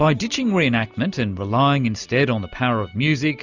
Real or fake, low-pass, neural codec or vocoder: real; 7.2 kHz; none